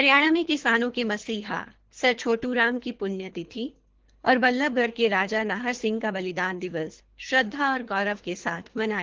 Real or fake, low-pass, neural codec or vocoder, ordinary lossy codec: fake; 7.2 kHz; codec, 24 kHz, 3 kbps, HILCodec; Opus, 16 kbps